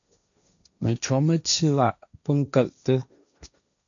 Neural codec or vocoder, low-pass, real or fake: codec, 16 kHz, 1.1 kbps, Voila-Tokenizer; 7.2 kHz; fake